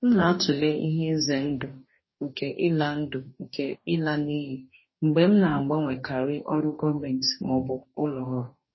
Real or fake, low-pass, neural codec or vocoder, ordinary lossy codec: fake; 7.2 kHz; codec, 44.1 kHz, 2.6 kbps, DAC; MP3, 24 kbps